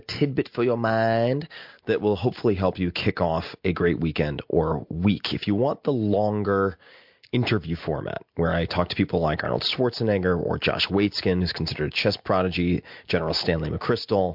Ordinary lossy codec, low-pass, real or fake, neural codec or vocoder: MP3, 48 kbps; 5.4 kHz; real; none